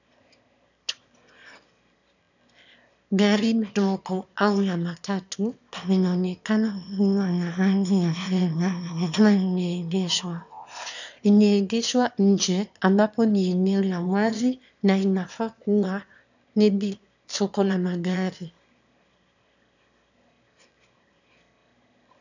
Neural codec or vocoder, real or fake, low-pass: autoencoder, 22.05 kHz, a latent of 192 numbers a frame, VITS, trained on one speaker; fake; 7.2 kHz